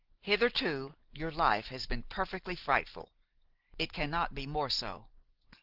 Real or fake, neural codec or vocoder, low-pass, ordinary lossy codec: real; none; 5.4 kHz; Opus, 16 kbps